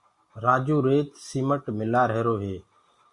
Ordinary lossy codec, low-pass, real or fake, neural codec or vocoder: Opus, 64 kbps; 10.8 kHz; real; none